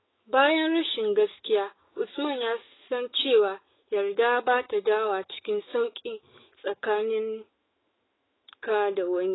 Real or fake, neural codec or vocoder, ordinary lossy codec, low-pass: fake; autoencoder, 48 kHz, 128 numbers a frame, DAC-VAE, trained on Japanese speech; AAC, 16 kbps; 7.2 kHz